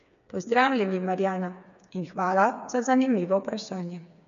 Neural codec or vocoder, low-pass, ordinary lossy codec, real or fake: codec, 16 kHz, 4 kbps, FreqCodec, smaller model; 7.2 kHz; none; fake